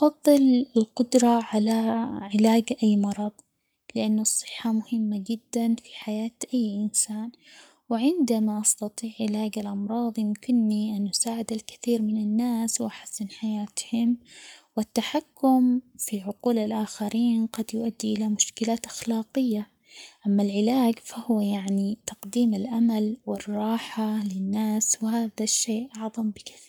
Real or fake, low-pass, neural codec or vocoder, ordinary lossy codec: fake; none; codec, 44.1 kHz, 7.8 kbps, Pupu-Codec; none